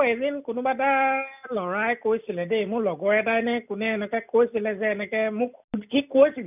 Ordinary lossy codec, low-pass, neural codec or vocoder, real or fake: none; 3.6 kHz; none; real